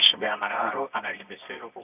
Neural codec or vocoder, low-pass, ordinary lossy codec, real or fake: codec, 24 kHz, 0.9 kbps, WavTokenizer, medium music audio release; 3.6 kHz; none; fake